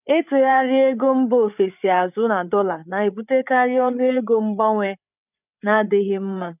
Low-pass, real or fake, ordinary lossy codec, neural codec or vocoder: 3.6 kHz; fake; none; codec, 16 kHz, 8 kbps, FreqCodec, larger model